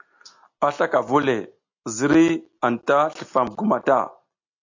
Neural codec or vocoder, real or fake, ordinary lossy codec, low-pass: none; real; AAC, 48 kbps; 7.2 kHz